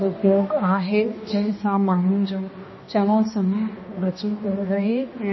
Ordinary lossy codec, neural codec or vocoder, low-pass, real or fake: MP3, 24 kbps; codec, 16 kHz, 1 kbps, X-Codec, HuBERT features, trained on balanced general audio; 7.2 kHz; fake